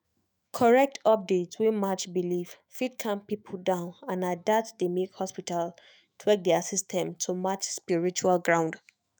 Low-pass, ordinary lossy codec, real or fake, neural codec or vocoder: none; none; fake; autoencoder, 48 kHz, 128 numbers a frame, DAC-VAE, trained on Japanese speech